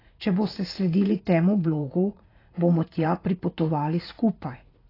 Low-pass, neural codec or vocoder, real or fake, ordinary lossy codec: 5.4 kHz; vocoder, 44.1 kHz, 80 mel bands, Vocos; fake; AAC, 24 kbps